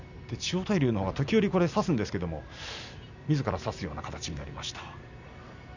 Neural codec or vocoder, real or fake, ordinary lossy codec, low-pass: none; real; none; 7.2 kHz